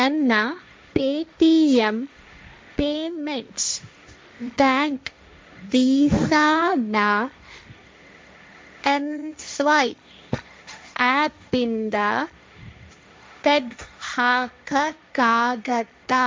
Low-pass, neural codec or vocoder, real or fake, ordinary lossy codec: none; codec, 16 kHz, 1.1 kbps, Voila-Tokenizer; fake; none